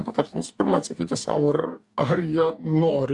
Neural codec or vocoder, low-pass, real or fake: codec, 44.1 kHz, 2.6 kbps, DAC; 10.8 kHz; fake